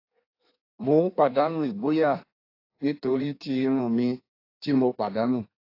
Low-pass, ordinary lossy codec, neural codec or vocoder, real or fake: 5.4 kHz; AAC, 32 kbps; codec, 16 kHz in and 24 kHz out, 1.1 kbps, FireRedTTS-2 codec; fake